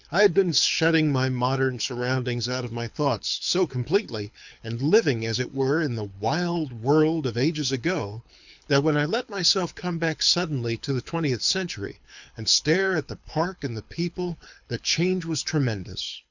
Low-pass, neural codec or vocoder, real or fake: 7.2 kHz; codec, 24 kHz, 6 kbps, HILCodec; fake